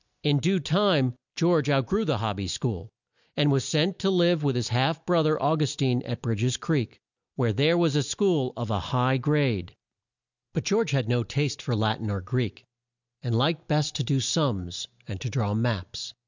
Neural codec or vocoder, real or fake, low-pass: none; real; 7.2 kHz